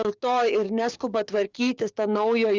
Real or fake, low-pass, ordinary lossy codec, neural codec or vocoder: fake; 7.2 kHz; Opus, 32 kbps; codec, 16 kHz, 6 kbps, DAC